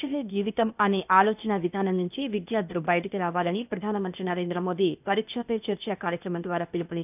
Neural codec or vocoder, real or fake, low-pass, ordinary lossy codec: codec, 16 kHz, 0.8 kbps, ZipCodec; fake; 3.6 kHz; none